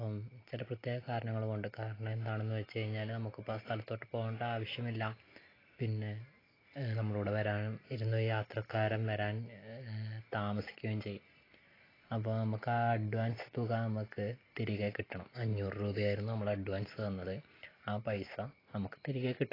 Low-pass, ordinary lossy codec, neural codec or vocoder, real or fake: 5.4 kHz; AAC, 24 kbps; none; real